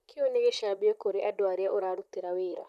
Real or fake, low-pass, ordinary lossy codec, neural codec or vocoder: fake; 14.4 kHz; none; vocoder, 44.1 kHz, 128 mel bands, Pupu-Vocoder